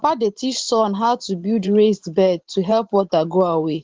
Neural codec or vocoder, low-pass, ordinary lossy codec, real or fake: vocoder, 44.1 kHz, 128 mel bands every 512 samples, BigVGAN v2; 7.2 kHz; Opus, 16 kbps; fake